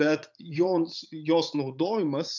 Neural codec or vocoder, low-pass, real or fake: vocoder, 44.1 kHz, 80 mel bands, Vocos; 7.2 kHz; fake